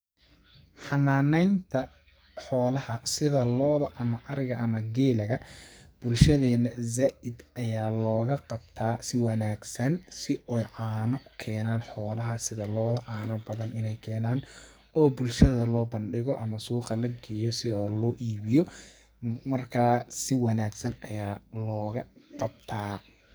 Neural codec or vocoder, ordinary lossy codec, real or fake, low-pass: codec, 44.1 kHz, 2.6 kbps, SNAC; none; fake; none